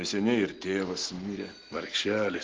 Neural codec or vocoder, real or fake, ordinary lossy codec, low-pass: none; real; Opus, 16 kbps; 7.2 kHz